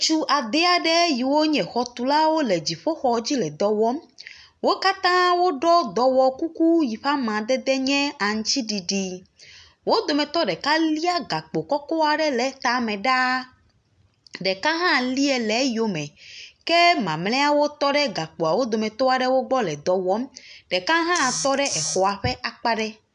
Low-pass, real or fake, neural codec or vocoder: 9.9 kHz; real; none